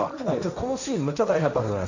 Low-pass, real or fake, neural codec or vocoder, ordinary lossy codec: 7.2 kHz; fake; codec, 16 kHz, 1.1 kbps, Voila-Tokenizer; none